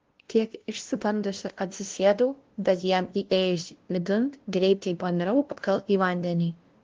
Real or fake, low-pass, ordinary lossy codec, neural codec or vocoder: fake; 7.2 kHz; Opus, 16 kbps; codec, 16 kHz, 0.5 kbps, FunCodec, trained on LibriTTS, 25 frames a second